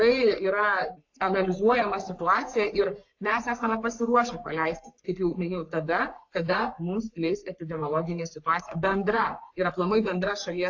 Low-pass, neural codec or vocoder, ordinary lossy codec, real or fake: 7.2 kHz; codec, 44.1 kHz, 3.4 kbps, Pupu-Codec; AAC, 48 kbps; fake